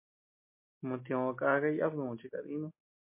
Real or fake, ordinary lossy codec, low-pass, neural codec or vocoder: real; MP3, 24 kbps; 3.6 kHz; none